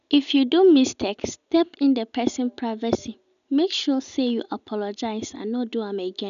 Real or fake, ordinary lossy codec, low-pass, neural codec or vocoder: real; none; 7.2 kHz; none